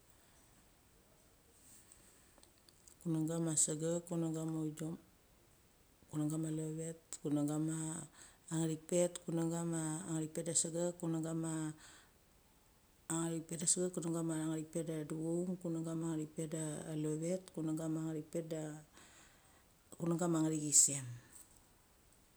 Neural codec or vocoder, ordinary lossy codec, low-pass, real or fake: none; none; none; real